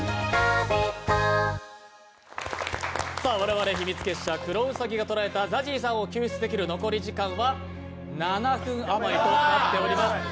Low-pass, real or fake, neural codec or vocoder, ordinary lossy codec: none; real; none; none